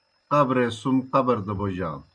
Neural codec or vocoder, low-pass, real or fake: none; 9.9 kHz; real